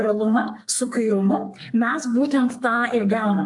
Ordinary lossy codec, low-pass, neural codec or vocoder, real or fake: AAC, 64 kbps; 10.8 kHz; codec, 32 kHz, 1.9 kbps, SNAC; fake